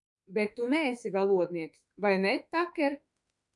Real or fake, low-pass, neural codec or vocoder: fake; 10.8 kHz; autoencoder, 48 kHz, 32 numbers a frame, DAC-VAE, trained on Japanese speech